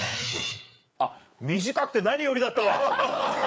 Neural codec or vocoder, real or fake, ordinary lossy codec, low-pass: codec, 16 kHz, 4 kbps, FreqCodec, larger model; fake; none; none